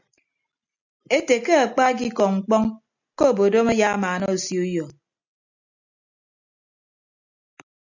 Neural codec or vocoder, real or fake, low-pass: none; real; 7.2 kHz